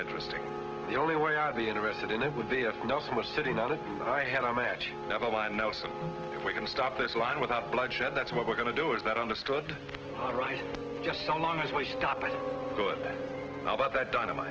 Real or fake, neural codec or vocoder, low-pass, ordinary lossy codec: real; none; 7.2 kHz; Opus, 16 kbps